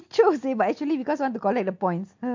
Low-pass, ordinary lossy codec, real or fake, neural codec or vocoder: 7.2 kHz; MP3, 48 kbps; real; none